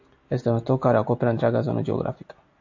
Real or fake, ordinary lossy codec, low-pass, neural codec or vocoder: real; AAC, 48 kbps; 7.2 kHz; none